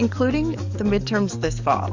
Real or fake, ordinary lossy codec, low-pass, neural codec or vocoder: fake; MP3, 64 kbps; 7.2 kHz; codec, 44.1 kHz, 7.8 kbps, DAC